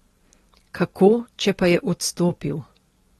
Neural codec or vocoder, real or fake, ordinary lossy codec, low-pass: none; real; AAC, 32 kbps; 19.8 kHz